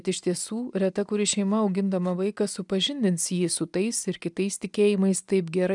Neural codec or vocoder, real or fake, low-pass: none; real; 10.8 kHz